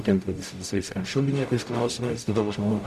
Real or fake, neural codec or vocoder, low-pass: fake; codec, 44.1 kHz, 0.9 kbps, DAC; 14.4 kHz